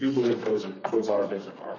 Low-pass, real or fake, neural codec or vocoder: 7.2 kHz; fake; codec, 32 kHz, 1.9 kbps, SNAC